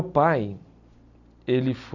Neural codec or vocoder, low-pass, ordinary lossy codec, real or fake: none; 7.2 kHz; none; real